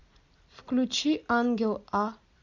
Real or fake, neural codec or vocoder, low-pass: real; none; 7.2 kHz